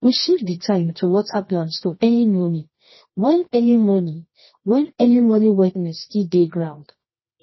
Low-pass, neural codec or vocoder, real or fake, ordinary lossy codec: 7.2 kHz; codec, 24 kHz, 0.9 kbps, WavTokenizer, medium music audio release; fake; MP3, 24 kbps